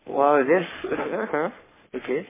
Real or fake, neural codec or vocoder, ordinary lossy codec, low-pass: fake; codec, 44.1 kHz, 3.4 kbps, Pupu-Codec; MP3, 16 kbps; 3.6 kHz